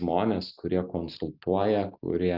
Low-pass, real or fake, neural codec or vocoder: 5.4 kHz; real; none